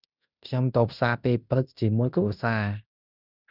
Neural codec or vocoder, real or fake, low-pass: codec, 16 kHz in and 24 kHz out, 0.9 kbps, LongCat-Audio-Codec, fine tuned four codebook decoder; fake; 5.4 kHz